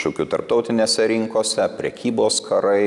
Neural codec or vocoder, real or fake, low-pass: none; real; 10.8 kHz